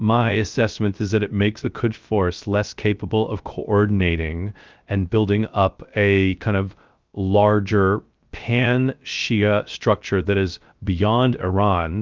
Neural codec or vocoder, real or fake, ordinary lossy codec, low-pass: codec, 16 kHz, 0.3 kbps, FocalCodec; fake; Opus, 24 kbps; 7.2 kHz